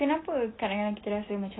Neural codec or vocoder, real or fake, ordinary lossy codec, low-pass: none; real; AAC, 16 kbps; 7.2 kHz